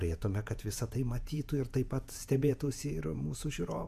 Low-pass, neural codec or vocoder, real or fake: 14.4 kHz; vocoder, 48 kHz, 128 mel bands, Vocos; fake